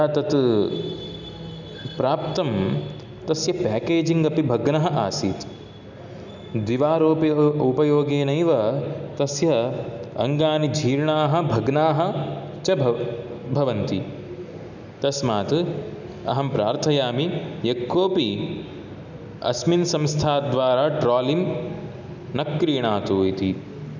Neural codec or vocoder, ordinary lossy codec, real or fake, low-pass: none; none; real; 7.2 kHz